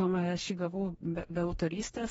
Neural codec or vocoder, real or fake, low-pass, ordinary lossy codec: codec, 44.1 kHz, 2.6 kbps, DAC; fake; 19.8 kHz; AAC, 24 kbps